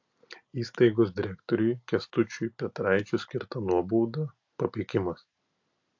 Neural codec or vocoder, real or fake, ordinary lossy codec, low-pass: none; real; AAC, 48 kbps; 7.2 kHz